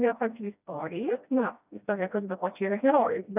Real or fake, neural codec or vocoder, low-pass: fake; codec, 16 kHz, 1 kbps, FreqCodec, smaller model; 3.6 kHz